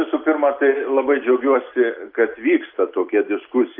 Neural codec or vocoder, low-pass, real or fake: none; 5.4 kHz; real